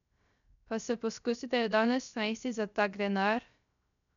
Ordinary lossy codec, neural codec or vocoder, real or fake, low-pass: none; codec, 16 kHz, 0.3 kbps, FocalCodec; fake; 7.2 kHz